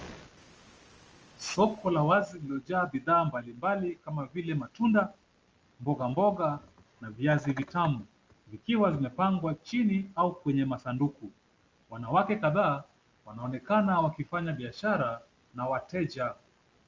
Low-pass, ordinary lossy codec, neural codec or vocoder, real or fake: 7.2 kHz; Opus, 24 kbps; none; real